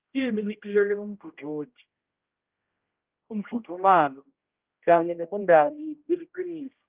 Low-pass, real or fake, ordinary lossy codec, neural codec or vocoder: 3.6 kHz; fake; Opus, 32 kbps; codec, 16 kHz, 0.5 kbps, X-Codec, HuBERT features, trained on general audio